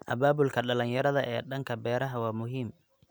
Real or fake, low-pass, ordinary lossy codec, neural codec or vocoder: real; none; none; none